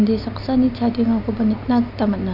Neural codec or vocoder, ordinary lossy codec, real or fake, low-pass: none; none; real; 5.4 kHz